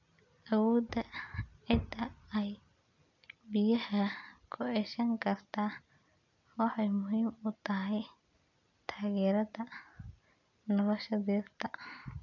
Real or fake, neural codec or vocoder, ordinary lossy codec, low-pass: real; none; none; 7.2 kHz